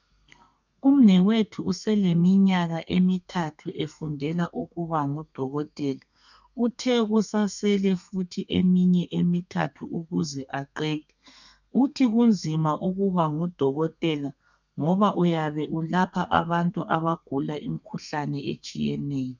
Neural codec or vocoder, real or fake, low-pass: codec, 32 kHz, 1.9 kbps, SNAC; fake; 7.2 kHz